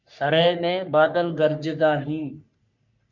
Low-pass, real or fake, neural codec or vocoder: 7.2 kHz; fake; codec, 44.1 kHz, 3.4 kbps, Pupu-Codec